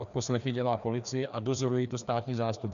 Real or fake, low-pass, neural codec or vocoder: fake; 7.2 kHz; codec, 16 kHz, 2 kbps, FreqCodec, larger model